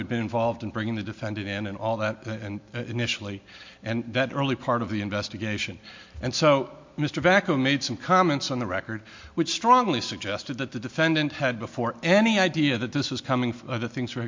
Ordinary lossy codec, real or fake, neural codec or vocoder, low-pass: MP3, 48 kbps; real; none; 7.2 kHz